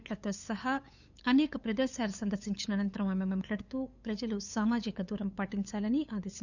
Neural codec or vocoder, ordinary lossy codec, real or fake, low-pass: codec, 16 kHz, 8 kbps, FunCodec, trained on LibriTTS, 25 frames a second; none; fake; 7.2 kHz